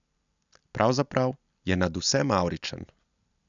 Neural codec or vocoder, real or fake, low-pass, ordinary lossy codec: none; real; 7.2 kHz; none